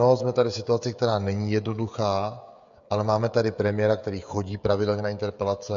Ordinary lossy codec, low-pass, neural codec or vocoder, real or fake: MP3, 48 kbps; 7.2 kHz; codec, 16 kHz, 16 kbps, FreqCodec, smaller model; fake